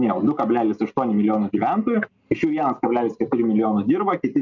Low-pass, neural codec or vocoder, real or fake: 7.2 kHz; none; real